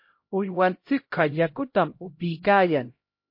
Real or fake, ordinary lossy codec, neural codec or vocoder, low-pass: fake; MP3, 32 kbps; codec, 16 kHz, 0.5 kbps, X-Codec, HuBERT features, trained on LibriSpeech; 5.4 kHz